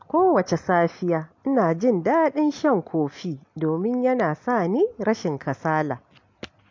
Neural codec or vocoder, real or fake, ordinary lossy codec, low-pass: none; real; MP3, 48 kbps; 7.2 kHz